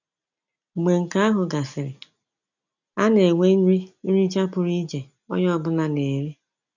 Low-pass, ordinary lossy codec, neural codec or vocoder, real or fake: 7.2 kHz; none; none; real